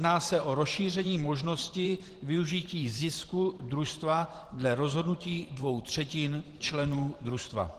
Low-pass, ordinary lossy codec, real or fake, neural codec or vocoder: 14.4 kHz; Opus, 16 kbps; fake; vocoder, 44.1 kHz, 128 mel bands every 512 samples, BigVGAN v2